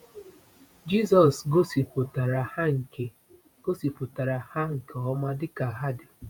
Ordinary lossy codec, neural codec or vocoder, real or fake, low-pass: none; vocoder, 48 kHz, 128 mel bands, Vocos; fake; none